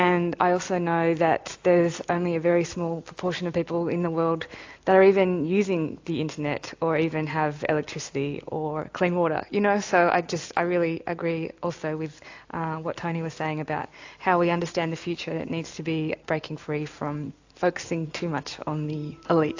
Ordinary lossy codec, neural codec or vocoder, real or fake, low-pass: AAC, 48 kbps; none; real; 7.2 kHz